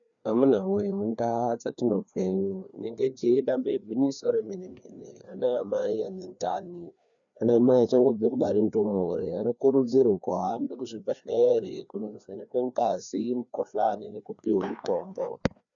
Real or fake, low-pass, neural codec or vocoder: fake; 7.2 kHz; codec, 16 kHz, 2 kbps, FreqCodec, larger model